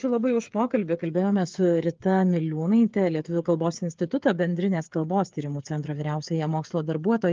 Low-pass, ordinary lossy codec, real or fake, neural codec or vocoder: 7.2 kHz; Opus, 32 kbps; fake; codec, 16 kHz, 8 kbps, FreqCodec, smaller model